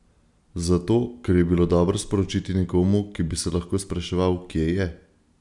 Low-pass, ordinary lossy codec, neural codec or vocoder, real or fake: 10.8 kHz; none; none; real